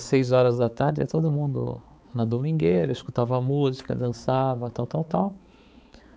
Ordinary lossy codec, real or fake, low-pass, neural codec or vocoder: none; fake; none; codec, 16 kHz, 4 kbps, X-Codec, HuBERT features, trained on balanced general audio